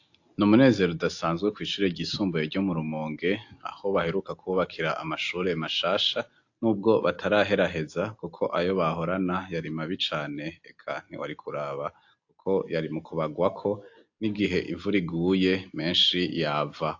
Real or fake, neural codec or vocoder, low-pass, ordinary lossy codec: real; none; 7.2 kHz; AAC, 48 kbps